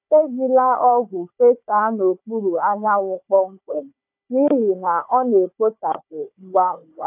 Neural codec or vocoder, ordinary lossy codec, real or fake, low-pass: codec, 16 kHz, 4 kbps, FunCodec, trained on Chinese and English, 50 frames a second; AAC, 32 kbps; fake; 3.6 kHz